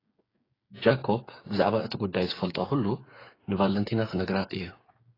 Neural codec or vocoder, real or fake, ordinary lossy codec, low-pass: codec, 16 kHz, 8 kbps, FreqCodec, smaller model; fake; AAC, 24 kbps; 5.4 kHz